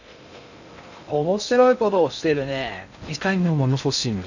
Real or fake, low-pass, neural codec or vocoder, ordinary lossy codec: fake; 7.2 kHz; codec, 16 kHz in and 24 kHz out, 0.6 kbps, FocalCodec, streaming, 2048 codes; none